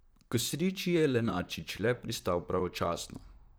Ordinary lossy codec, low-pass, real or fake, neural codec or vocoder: none; none; fake; vocoder, 44.1 kHz, 128 mel bands, Pupu-Vocoder